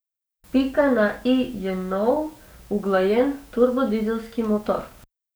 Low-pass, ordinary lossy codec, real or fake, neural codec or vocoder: none; none; fake; codec, 44.1 kHz, 7.8 kbps, DAC